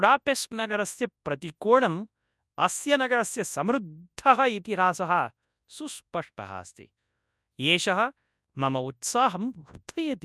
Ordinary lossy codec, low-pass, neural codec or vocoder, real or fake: none; none; codec, 24 kHz, 0.9 kbps, WavTokenizer, large speech release; fake